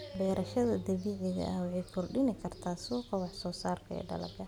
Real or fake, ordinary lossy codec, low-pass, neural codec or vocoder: real; none; 19.8 kHz; none